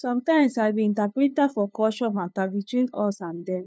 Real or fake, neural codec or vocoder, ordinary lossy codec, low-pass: fake; codec, 16 kHz, 2 kbps, FunCodec, trained on LibriTTS, 25 frames a second; none; none